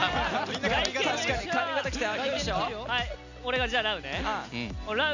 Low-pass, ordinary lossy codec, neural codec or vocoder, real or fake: 7.2 kHz; none; none; real